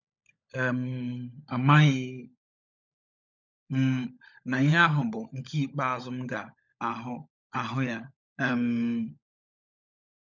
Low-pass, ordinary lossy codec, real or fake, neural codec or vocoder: 7.2 kHz; none; fake; codec, 16 kHz, 16 kbps, FunCodec, trained on LibriTTS, 50 frames a second